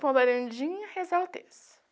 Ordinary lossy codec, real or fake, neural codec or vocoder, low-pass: none; real; none; none